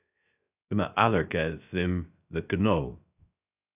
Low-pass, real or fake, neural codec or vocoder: 3.6 kHz; fake; codec, 16 kHz, 0.3 kbps, FocalCodec